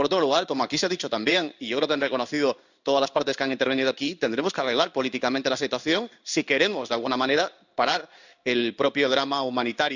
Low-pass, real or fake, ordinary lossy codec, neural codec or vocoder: 7.2 kHz; fake; none; codec, 16 kHz in and 24 kHz out, 1 kbps, XY-Tokenizer